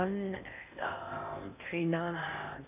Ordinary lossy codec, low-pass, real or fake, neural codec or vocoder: none; 3.6 kHz; fake; codec, 16 kHz in and 24 kHz out, 0.6 kbps, FocalCodec, streaming, 4096 codes